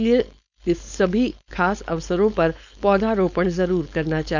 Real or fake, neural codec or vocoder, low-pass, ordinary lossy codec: fake; codec, 16 kHz, 4.8 kbps, FACodec; 7.2 kHz; none